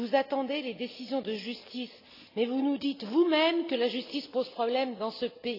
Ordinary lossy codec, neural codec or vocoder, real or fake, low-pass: AAC, 32 kbps; none; real; 5.4 kHz